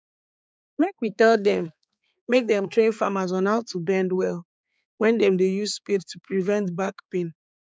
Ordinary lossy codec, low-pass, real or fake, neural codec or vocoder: none; none; fake; codec, 16 kHz, 4 kbps, X-Codec, HuBERT features, trained on balanced general audio